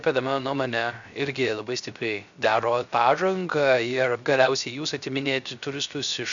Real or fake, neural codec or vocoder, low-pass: fake; codec, 16 kHz, 0.3 kbps, FocalCodec; 7.2 kHz